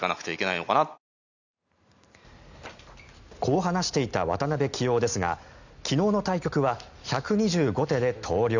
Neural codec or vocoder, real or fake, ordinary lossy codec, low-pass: none; real; none; 7.2 kHz